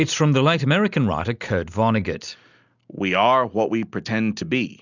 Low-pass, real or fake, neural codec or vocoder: 7.2 kHz; real; none